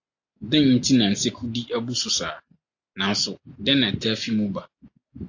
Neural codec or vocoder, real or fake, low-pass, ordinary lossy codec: none; real; 7.2 kHz; AAC, 48 kbps